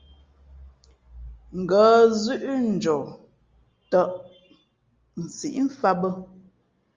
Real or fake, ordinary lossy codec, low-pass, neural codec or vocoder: real; Opus, 32 kbps; 7.2 kHz; none